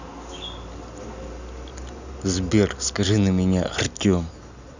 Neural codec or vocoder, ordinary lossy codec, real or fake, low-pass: none; none; real; 7.2 kHz